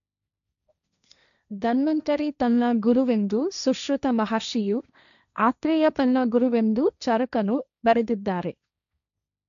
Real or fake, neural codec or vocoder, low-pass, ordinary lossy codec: fake; codec, 16 kHz, 1.1 kbps, Voila-Tokenizer; 7.2 kHz; MP3, 96 kbps